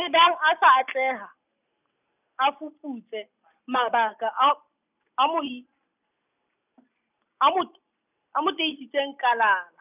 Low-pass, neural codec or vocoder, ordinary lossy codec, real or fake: 3.6 kHz; none; none; real